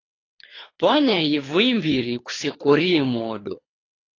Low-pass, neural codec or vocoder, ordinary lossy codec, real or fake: 7.2 kHz; codec, 24 kHz, 3 kbps, HILCodec; AAC, 32 kbps; fake